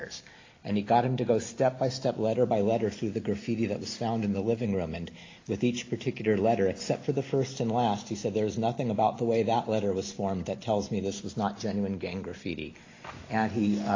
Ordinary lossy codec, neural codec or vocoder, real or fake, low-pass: AAC, 32 kbps; none; real; 7.2 kHz